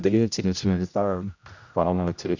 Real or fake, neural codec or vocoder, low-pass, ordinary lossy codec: fake; codec, 16 kHz, 0.5 kbps, X-Codec, HuBERT features, trained on general audio; 7.2 kHz; none